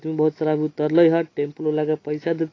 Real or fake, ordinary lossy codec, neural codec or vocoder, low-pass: real; AAC, 32 kbps; none; 7.2 kHz